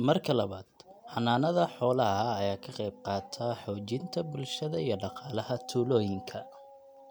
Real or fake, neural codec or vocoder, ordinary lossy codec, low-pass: real; none; none; none